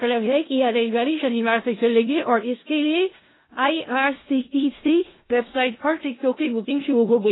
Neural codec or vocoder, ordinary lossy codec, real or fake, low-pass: codec, 16 kHz in and 24 kHz out, 0.4 kbps, LongCat-Audio-Codec, four codebook decoder; AAC, 16 kbps; fake; 7.2 kHz